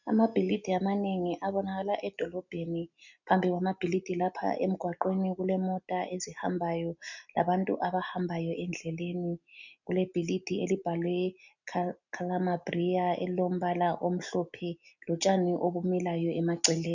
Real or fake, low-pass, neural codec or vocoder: real; 7.2 kHz; none